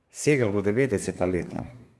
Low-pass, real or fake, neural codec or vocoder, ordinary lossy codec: none; fake; codec, 24 kHz, 1 kbps, SNAC; none